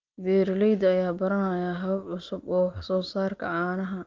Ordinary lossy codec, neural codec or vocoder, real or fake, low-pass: Opus, 32 kbps; none; real; 7.2 kHz